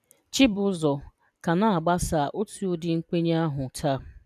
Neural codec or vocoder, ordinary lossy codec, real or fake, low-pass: vocoder, 44.1 kHz, 128 mel bands every 256 samples, BigVGAN v2; none; fake; 14.4 kHz